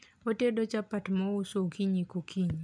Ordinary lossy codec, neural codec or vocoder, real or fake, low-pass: none; none; real; 9.9 kHz